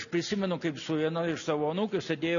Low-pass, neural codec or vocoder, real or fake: 7.2 kHz; none; real